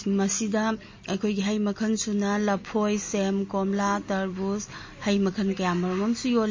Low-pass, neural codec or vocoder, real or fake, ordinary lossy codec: 7.2 kHz; none; real; MP3, 32 kbps